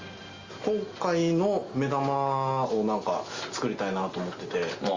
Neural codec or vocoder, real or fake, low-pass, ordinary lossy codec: none; real; 7.2 kHz; Opus, 32 kbps